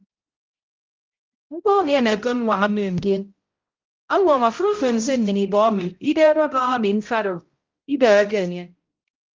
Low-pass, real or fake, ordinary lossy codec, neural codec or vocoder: 7.2 kHz; fake; Opus, 32 kbps; codec, 16 kHz, 0.5 kbps, X-Codec, HuBERT features, trained on balanced general audio